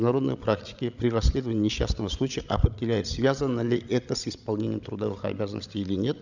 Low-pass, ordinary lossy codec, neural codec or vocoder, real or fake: 7.2 kHz; none; codec, 16 kHz, 16 kbps, FreqCodec, larger model; fake